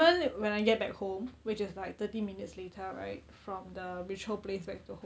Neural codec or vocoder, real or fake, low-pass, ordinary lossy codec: none; real; none; none